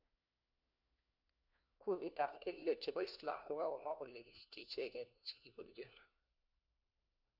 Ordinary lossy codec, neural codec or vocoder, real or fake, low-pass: none; codec, 16 kHz, 1 kbps, FunCodec, trained on LibriTTS, 50 frames a second; fake; 5.4 kHz